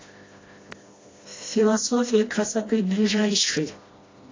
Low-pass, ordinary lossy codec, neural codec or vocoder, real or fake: 7.2 kHz; AAC, 32 kbps; codec, 16 kHz, 1 kbps, FreqCodec, smaller model; fake